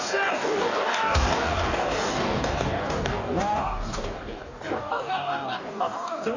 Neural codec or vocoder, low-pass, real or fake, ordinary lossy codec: codec, 44.1 kHz, 2.6 kbps, DAC; 7.2 kHz; fake; none